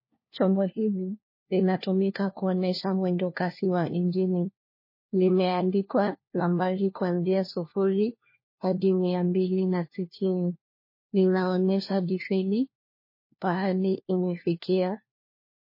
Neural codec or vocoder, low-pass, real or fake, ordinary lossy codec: codec, 16 kHz, 1 kbps, FunCodec, trained on LibriTTS, 50 frames a second; 5.4 kHz; fake; MP3, 24 kbps